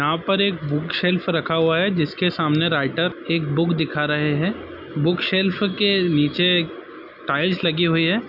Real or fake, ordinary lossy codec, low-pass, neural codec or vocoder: real; none; 5.4 kHz; none